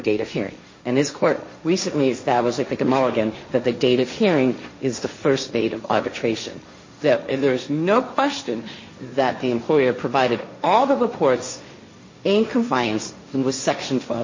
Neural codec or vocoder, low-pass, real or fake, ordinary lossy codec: codec, 16 kHz, 1.1 kbps, Voila-Tokenizer; 7.2 kHz; fake; MP3, 32 kbps